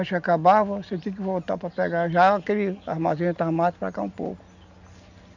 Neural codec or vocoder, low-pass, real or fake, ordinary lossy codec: none; 7.2 kHz; real; none